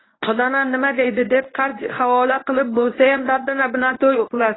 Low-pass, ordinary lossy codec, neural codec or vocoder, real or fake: 7.2 kHz; AAC, 16 kbps; codec, 24 kHz, 0.9 kbps, WavTokenizer, medium speech release version 1; fake